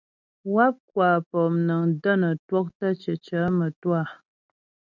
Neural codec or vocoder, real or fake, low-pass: none; real; 7.2 kHz